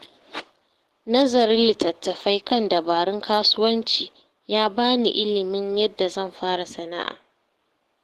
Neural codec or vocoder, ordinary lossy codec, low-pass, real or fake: codec, 44.1 kHz, 7.8 kbps, DAC; Opus, 16 kbps; 14.4 kHz; fake